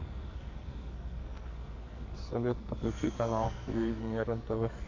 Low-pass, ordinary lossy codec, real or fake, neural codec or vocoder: 7.2 kHz; MP3, 48 kbps; fake; codec, 44.1 kHz, 2.6 kbps, SNAC